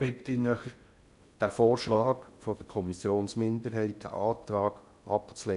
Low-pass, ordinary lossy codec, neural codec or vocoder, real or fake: 10.8 kHz; none; codec, 16 kHz in and 24 kHz out, 0.6 kbps, FocalCodec, streaming, 2048 codes; fake